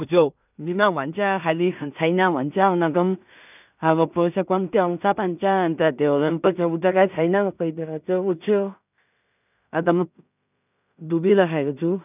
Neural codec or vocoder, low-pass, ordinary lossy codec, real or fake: codec, 16 kHz in and 24 kHz out, 0.4 kbps, LongCat-Audio-Codec, two codebook decoder; 3.6 kHz; none; fake